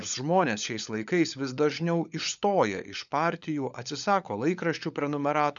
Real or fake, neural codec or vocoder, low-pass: fake; codec, 16 kHz, 16 kbps, FunCodec, trained on LibriTTS, 50 frames a second; 7.2 kHz